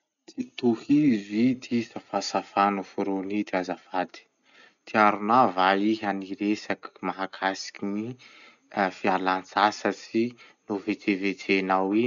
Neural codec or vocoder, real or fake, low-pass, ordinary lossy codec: none; real; 7.2 kHz; none